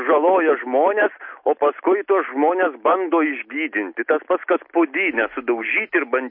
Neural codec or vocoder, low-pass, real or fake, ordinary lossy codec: none; 5.4 kHz; real; MP3, 32 kbps